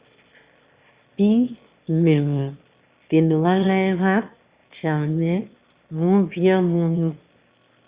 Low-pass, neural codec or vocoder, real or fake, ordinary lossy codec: 3.6 kHz; autoencoder, 22.05 kHz, a latent of 192 numbers a frame, VITS, trained on one speaker; fake; Opus, 64 kbps